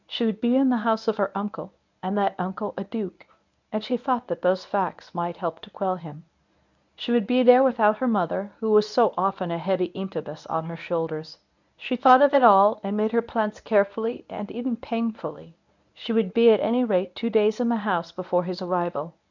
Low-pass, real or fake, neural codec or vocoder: 7.2 kHz; fake; codec, 24 kHz, 0.9 kbps, WavTokenizer, medium speech release version 1